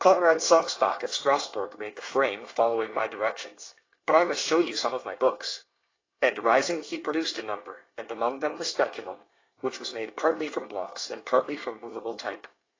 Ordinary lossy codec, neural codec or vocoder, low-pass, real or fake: AAC, 32 kbps; codec, 24 kHz, 1 kbps, SNAC; 7.2 kHz; fake